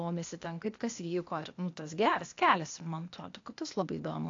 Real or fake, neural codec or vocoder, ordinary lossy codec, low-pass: fake; codec, 16 kHz, 0.8 kbps, ZipCodec; AAC, 48 kbps; 7.2 kHz